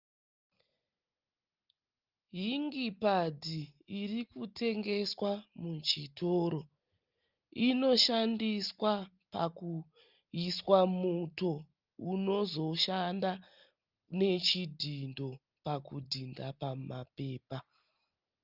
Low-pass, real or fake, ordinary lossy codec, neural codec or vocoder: 5.4 kHz; real; Opus, 32 kbps; none